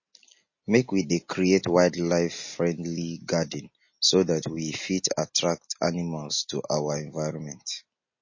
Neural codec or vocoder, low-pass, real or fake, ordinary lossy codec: none; 7.2 kHz; real; MP3, 32 kbps